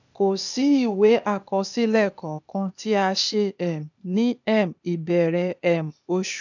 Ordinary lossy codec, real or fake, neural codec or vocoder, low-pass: none; fake; codec, 16 kHz, 0.8 kbps, ZipCodec; 7.2 kHz